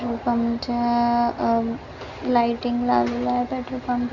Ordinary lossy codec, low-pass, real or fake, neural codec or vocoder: none; 7.2 kHz; real; none